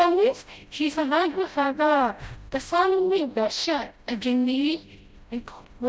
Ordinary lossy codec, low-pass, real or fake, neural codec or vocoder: none; none; fake; codec, 16 kHz, 0.5 kbps, FreqCodec, smaller model